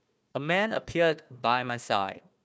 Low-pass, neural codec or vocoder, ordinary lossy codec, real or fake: none; codec, 16 kHz, 1 kbps, FunCodec, trained on Chinese and English, 50 frames a second; none; fake